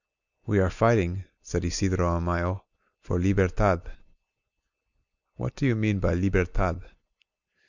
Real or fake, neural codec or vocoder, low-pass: real; none; 7.2 kHz